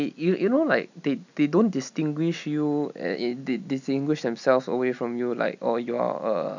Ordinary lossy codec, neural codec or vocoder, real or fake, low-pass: none; none; real; 7.2 kHz